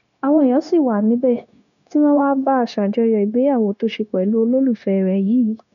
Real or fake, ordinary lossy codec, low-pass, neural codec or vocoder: fake; none; 7.2 kHz; codec, 16 kHz, 0.9 kbps, LongCat-Audio-Codec